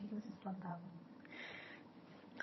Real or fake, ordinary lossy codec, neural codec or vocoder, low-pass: fake; MP3, 24 kbps; vocoder, 22.05 kHz, 80 mel bands, HiFi-GAN; 7.2 kHz